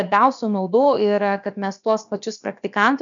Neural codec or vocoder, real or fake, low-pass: codec, 16 kHz, about 1 kbps, DyCAST, with the encoder's durations; fake; 7.2 kHz